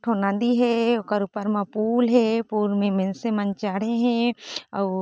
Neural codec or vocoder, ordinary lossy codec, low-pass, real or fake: none; none; none; real